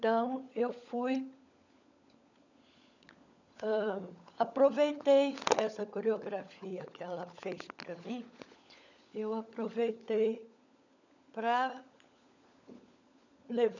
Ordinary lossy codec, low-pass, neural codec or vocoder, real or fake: none; 7.2 kHz; codec, 16 kHz, 16 kbps, FunCodec, trained on LibriTTS, 50 frames a second; fake